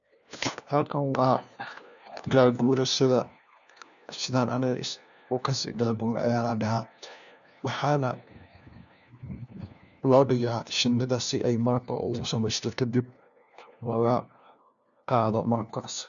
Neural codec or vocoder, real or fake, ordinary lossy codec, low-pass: codec, 16 kHz, 1 kbps, FunCodec, trained on LibriTTS, 50 frames a second; fake; none; 7.2 kHz